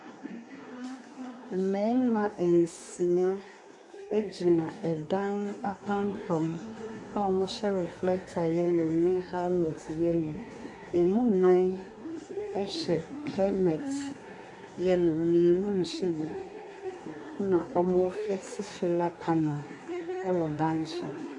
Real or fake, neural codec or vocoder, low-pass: fake; codec, 24 kHz, 1 kbps, SNAC; 10.8 kHz